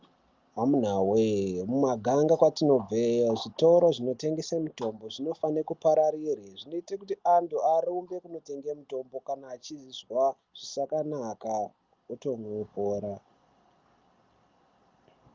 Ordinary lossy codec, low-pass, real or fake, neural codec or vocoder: Opus, 24 kbps; 7.2 kHz; real; none